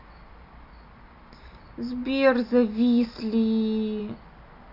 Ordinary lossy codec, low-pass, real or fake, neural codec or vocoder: Opus, 64 kbps; 5.4 kHz; real; none